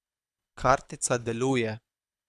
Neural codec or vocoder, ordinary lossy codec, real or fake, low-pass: codec, 24 kHz, 6 kbps, HILCodec; none; fake; none